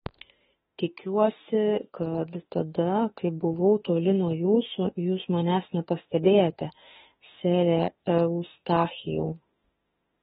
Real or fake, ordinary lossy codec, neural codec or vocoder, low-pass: fake; AAC, 16 kbps; autoencoder, 48 kHz, 32 numbers a frame, DAC-VAE, trained on Japanese speech; 19.8 kHz